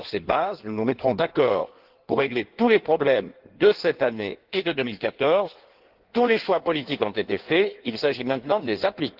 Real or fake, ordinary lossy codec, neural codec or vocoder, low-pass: fake; Opus, 16 kbps; codec, 16 kHz in and 24 kHz out, 1.1 kbps, FireRedTTS-2 codec; 5.4 kHz